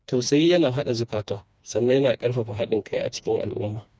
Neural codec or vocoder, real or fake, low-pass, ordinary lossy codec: codec, 16 kHz, 2 kbps, FreqCodec, smaller model; fake; none; none